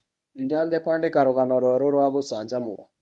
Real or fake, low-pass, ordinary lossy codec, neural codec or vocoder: fake; 10.8 kHz; none; codec, 24 kHz, 0.9 kbps, WavTokenizer, medium speech release version 1